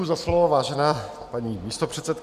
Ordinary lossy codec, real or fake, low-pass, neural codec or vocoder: Opus, 64 kbps; real; 14.4 kHz; none